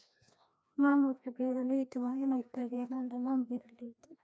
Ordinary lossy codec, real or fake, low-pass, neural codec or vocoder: none; fake; none; codec, 16 kHz, 1 kbps, FreqCodec, larger model